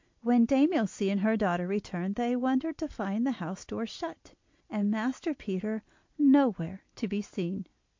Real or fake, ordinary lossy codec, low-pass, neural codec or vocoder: real; MP3, 48 kbps; 7.2 kHz; none